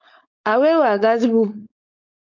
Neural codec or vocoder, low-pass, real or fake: codec, 16 kHz, 4.8 kbps, FACodec; 7.2 kHz; fake